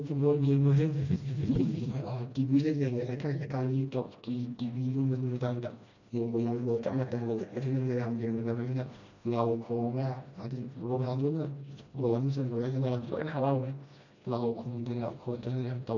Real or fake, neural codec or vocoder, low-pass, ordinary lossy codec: fake; codec, 16 kHz, 1 kbps, FreqCodec, smaller model; 7.2 kHz; AAC, 48 kbps